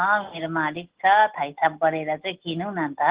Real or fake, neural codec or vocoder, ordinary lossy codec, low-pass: real; none; Opus, 16 kbps; 3.6 kHz